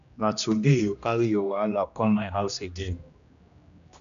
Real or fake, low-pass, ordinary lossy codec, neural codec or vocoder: fake; 7.2 kHz; none; codec, 16 kHz, 1 kbps, X-Codec, HuBERT features, trained on general audio